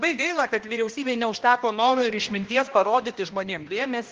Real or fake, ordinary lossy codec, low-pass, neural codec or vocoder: fake; Opus, 16 kbps; 7.2 kHz; codec, 16 kHz, 1 kbps, X-Codec, HuBERT features, trained on balanced general audio